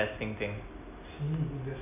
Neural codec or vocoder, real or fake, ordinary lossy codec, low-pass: none; real; none; 3.6 kHz